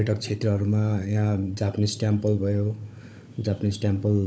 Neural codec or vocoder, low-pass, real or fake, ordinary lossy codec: codec, 16 kHz, 16 kbps, FunCodec, trained on Chinese and English, 50 frames a second; none; fake; none